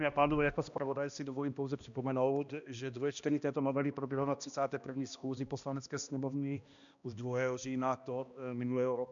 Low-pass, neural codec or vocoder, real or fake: 7.2 kHz; codec, 16 kHz, 1 kbps, X-Codec, HuBERT features, trained on balanced general audio; fake